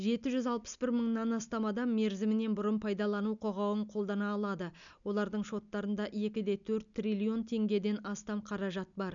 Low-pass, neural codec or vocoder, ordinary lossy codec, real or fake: 7.2 kHz; none; MP3, 96 kbps; real